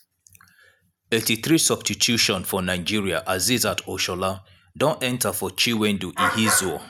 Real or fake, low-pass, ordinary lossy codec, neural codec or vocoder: real; none; none; none